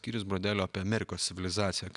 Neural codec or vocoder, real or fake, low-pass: none; real; 10.8 kHz